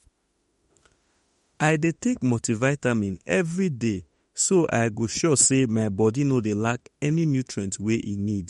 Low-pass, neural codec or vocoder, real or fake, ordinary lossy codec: 19.8 kHz; autoencoder, 48 kHz, 32 numbers a frame, DAC-VAE, trained on Japanese speech; fake; MP3, 48 kbps